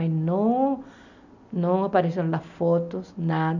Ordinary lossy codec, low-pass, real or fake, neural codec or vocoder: none; 7.2 kHz; real; none